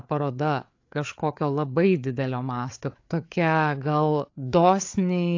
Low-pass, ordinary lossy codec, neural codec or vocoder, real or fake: 7.2 kHz; AAC, 48 kbps; codec, 16 kHz, 4 kbps, FreqCodec, larger model; fake